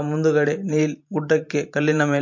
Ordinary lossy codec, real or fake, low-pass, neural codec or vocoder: MP3, 48 kbps; fake; 7.2 kHz; vocoder, 44.1 kHz, 128 mel bands every 512 samples, BigVGAN v2